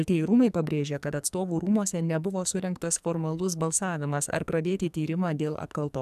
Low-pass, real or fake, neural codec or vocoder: 14.4 kHz; fake; codec, 44.1 kHz, 2.6 kbps, SNAC